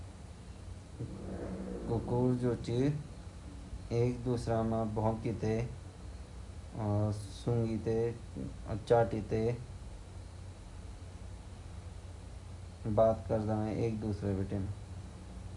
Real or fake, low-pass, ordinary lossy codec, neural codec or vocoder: real; 10.8 kHz; none; none